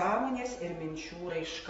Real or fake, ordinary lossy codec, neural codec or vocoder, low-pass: real; AAC, 24 kbps; none; 10.8 kHz